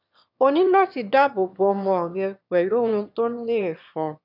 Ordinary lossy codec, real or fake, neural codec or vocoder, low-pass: none; fake; autoencoder, 22.05 kHz, a latent of 192 numbers a frame, VITS, trained on one speaker; 5.4 kHz